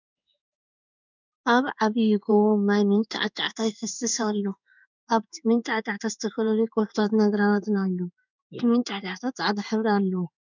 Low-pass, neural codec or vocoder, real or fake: 7.2 kHz; codec, 16 kHz in and 24 kHz out, 1 kbps, XY-Tokenizer; fake